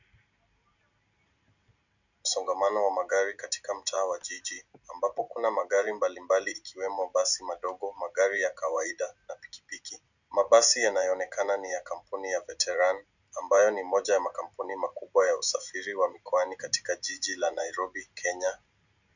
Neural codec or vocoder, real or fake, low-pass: none; real; 7.2 kHz